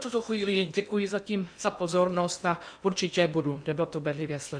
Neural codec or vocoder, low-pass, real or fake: codec, 16 kHz in and 24 kHz out, 0.8 kbps, FocalCodec, streaming, 65536 codes; 9.9 kHz; fake